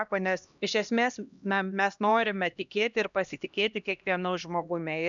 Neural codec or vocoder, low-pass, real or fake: codec, 16 kHz, 1 kbps, X-Codec, HuBERT features, trained on LibriSpeech; 7.2 kHz; fake